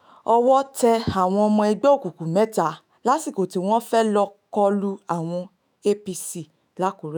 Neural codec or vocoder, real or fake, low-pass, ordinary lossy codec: autoencoder, 48 kHz, 128 numbers a frame, DAC-VAE, trained on Japanese speech; fake; none; none